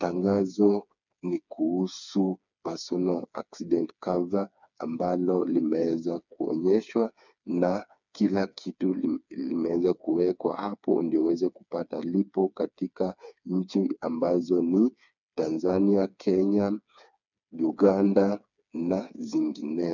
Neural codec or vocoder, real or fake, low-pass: codec, 16 kHz, 4 kbps, FreqCodec, smaller model; fake; 7.2 kHz